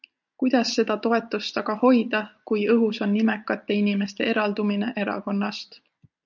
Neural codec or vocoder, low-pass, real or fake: none; 7.2 kHz; real